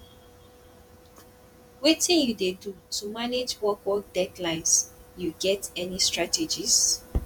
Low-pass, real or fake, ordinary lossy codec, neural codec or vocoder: 19.8 kHz; fake; none; vocoder, 48 kHz, 128 mel bands, Vocos